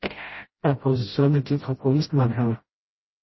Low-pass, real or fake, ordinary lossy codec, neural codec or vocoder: 7.2 kHz; fake; MP3, 24 kbps; codec, 16 kHz, 0.5 kbps, FreqCodec, smaller model